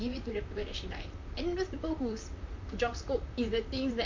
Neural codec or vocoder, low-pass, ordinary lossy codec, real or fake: vocoder, 44.1 kHz, 128 mel bands, Pupu-Vocoder; 7.2 kHz; MP3, 48 kbps; fake